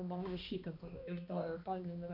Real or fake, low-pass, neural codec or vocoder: fake; 5.4 kHz; codec, 16 kHz, 1 kbps, X-Codec, HuBERT features, trained on balanced general audio